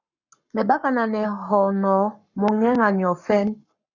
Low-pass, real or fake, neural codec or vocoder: 7.2 kHz; fake; codec, 44.1 kHz, 7.8 kbps, Pupu-Codec